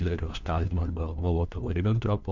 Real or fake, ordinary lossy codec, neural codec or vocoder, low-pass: fake; Opus, 64 kbps; codec, 16 kHz, 1 kbps, FunCodec, trained on LibriTTS, 50 frames a second; 7.2 kHz